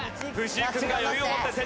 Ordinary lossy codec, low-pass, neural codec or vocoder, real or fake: none; none; none; real